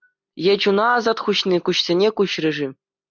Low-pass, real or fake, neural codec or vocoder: 7.2 kHz; real; none